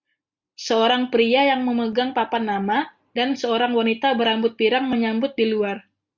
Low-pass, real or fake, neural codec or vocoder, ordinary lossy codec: 7.2 kHz; real; none; Opus, 64 kbps